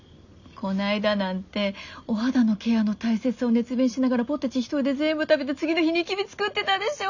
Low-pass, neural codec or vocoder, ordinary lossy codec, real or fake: 7.2 kHz; none; none; real